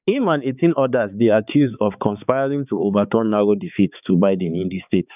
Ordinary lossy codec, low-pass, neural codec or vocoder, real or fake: none; 3.6 kHz; codec, 16 kHz, 4 kbps, X-Codec, HuBERT features, trained on balanced general audio; fake